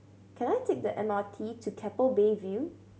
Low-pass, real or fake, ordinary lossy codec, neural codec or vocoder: none; real; none; none